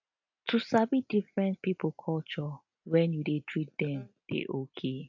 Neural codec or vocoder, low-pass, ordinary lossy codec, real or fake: none; 7.2 kHz; AAC, 48 kbps; real